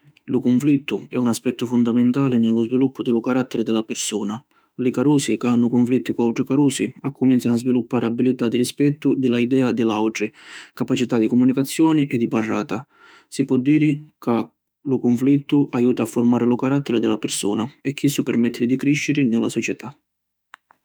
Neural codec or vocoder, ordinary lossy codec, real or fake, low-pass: autoencoder, 48 kHz, 32 numbers a frame, DAC-VAE, trained on Japanese speech; none; fake; none